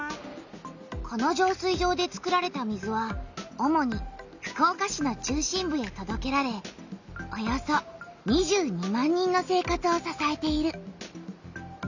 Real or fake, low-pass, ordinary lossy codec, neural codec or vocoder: real; 7.2 kHz; none; none